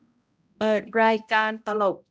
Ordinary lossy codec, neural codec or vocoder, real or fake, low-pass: none; codec, 16 kHz, 0.5 kbps, X-Codec, HuBERT features, trained on balanced general audio; fake; none